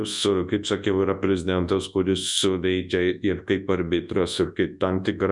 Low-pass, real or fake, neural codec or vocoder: 10.8 kHz; fake; codec, 24 kHz, 0.9 kbps, WavTokenizer, large speech release